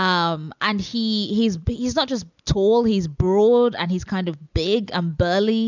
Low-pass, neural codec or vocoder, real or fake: 7.2 kHz; none; real